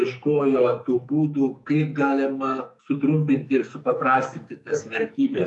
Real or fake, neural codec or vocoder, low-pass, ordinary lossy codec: fake; codec, 44.1 kHz, 2.6 kbps, SNAC; 10.8 kHz; MP3, 96 kbps